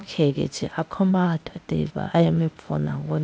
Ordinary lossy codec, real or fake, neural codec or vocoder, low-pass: none; fake; codec, 16 kHz, 0.8 kbps, ZipCodec; none